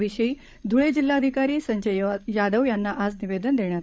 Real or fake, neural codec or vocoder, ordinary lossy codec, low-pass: fake; codec, 16 kHz, 16 kbps, FreqCodec, larger model; none; none